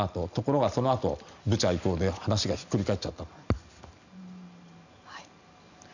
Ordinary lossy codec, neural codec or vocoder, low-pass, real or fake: none; none; 7.2 kHz; real